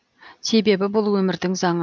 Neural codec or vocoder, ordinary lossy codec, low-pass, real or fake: none; none; none; real